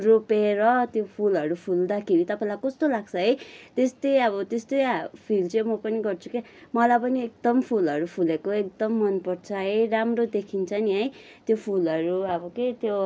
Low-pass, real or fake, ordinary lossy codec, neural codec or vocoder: none; real; none; none